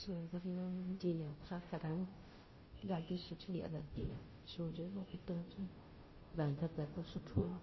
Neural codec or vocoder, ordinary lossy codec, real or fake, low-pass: codec, 16 kHz, 0.5 kbps, FunCodec, trained on Chinese and English, 25 frames a second; MP3, 24 kbps; fake; 7.2 kHz